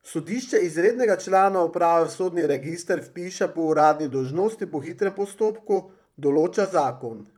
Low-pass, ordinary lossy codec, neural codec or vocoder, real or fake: 19.8 kHz; none; vocoder, 44.1 kHz, 128 mel bands, Pupu-Vocoder; fake